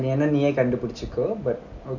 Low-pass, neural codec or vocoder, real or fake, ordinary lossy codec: 7.2 kHz; none; real; none